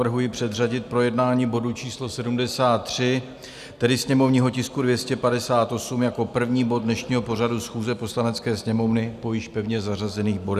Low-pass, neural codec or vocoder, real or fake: 14.4 kHz; none; real